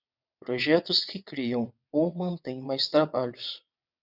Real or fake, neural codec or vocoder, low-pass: fake; vocoder, 22.05 kHz, 80 mel bands, WaveNeXt; 5.4 kHz